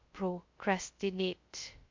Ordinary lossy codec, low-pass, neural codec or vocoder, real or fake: MP3, 48 kbps; 7.2 kHz; codec, 16 kHz, 0.2 kbps, FocalCodec; fake